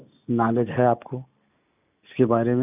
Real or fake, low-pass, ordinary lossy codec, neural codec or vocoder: real; 3.6 kHz; none; none